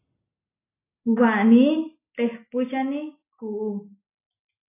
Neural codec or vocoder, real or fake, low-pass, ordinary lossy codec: none; real; 3.6 kHz; AAC, 24 kbps